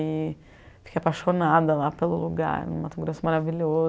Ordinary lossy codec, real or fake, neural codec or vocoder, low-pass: none; real; none; none